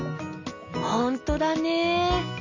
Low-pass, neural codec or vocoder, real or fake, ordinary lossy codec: 7.2 kHz; none; real; none